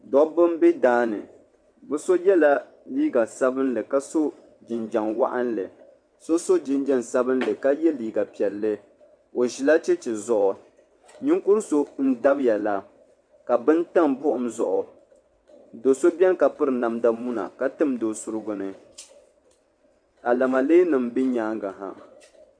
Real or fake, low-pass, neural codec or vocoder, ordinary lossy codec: fake; 9.9 kHz; vocoder, 22.05 kHz, 80 mel bands, WaveNeXt; MP3, 64 kbps